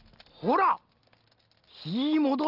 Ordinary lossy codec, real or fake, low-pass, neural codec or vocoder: Opus, 32 kbps; real; 5.4 kHz; none